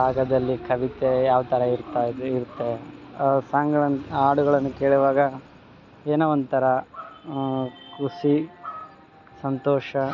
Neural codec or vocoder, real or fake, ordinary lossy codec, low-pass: none; real; none; 7.2 kHz